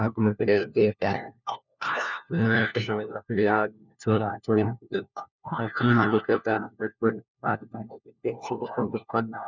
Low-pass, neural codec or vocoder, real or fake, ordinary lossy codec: 7.2 kHz; codec, 16 kHz, 1 kbps, FunCodec, trained on LibriTTS, 50 frames a second; fake; none